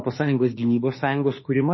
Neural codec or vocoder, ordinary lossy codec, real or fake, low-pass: autoencoder, 48 kHz, 32 numbers a frame, DAC-VAE, trained on Japanese speech; MP3, 24 kbps; fake; 7.2 kHz